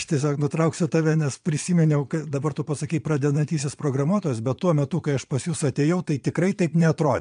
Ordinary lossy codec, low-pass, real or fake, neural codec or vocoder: MP3, 64 kbps; 9.9 kHz; fake; vocoder, 22.05 kHz, 80 mel bands, Vocos